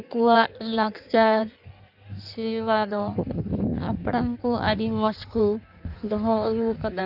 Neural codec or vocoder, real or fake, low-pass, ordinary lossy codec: codec, 16 kHz in and 24 kHz out, 1.1 kbps, FireRedTTS-2 codec; fake; 5.4 kHz; none